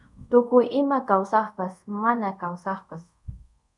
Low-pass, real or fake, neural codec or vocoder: 10.8 kHz; fake; codec, 24 kHz, 0.5 kbps, DualCodec